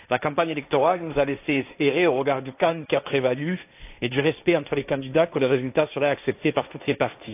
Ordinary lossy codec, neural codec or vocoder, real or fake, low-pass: AAC, 32 kbps; codec, 16 kHz, 1.1 kbps, Voila-Tokenizer; fake; 3.6 kHz